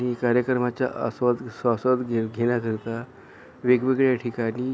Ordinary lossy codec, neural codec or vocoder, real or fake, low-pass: none; none; real; none